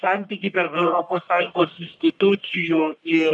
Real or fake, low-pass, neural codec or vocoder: fake; 10.8 kHz; codec, 44.1 kHz, 1.7 kbps, Pupu-Codec